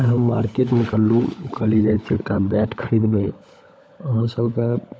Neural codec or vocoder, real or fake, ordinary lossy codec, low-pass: codec, 16 kHz, 16 kbps, FunCodec, trained on LibriTTS, 50 frames a second; fake; none; none